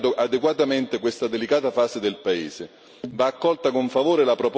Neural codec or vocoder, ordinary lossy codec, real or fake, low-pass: none; none; real; none